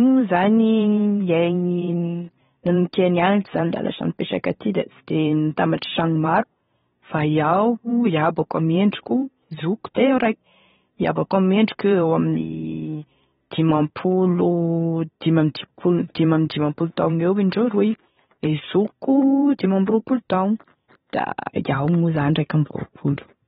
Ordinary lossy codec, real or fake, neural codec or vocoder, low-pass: AAC, 16 kbps; fake; vocoder, 44.1 kHz, 128 mel bands every 512 samples, BigVGAN v2; 19.8 kHz